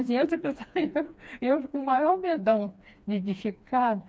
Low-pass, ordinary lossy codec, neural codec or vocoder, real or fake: none; none; codec, 16 kHz, 2 kbps, FreqCodec, smaller model; fake